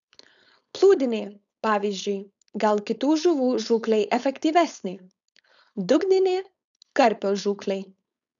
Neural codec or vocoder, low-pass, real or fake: codec, 16 kHz, 4.8 kbps, FACodec; 7.2 kHz; fake